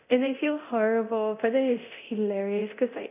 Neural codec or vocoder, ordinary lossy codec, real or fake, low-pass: codec, 24 kHz, 0.9 kbps, DualCodec; none; fake; 3.6 kHz